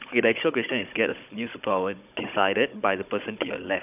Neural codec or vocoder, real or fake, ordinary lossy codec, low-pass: codec, 16 kHz, 16 kbps, FunCodec, trained on Chinese and English, 50 frames a second; fake; none; 3.6 kHz